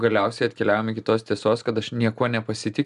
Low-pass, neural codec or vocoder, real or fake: 10.8 kHz; none; real